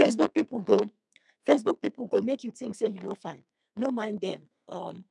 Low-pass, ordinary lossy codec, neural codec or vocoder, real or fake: 10.8 kHz; none; codec, 32 kHz, 1.9 kbps, SNAC; fake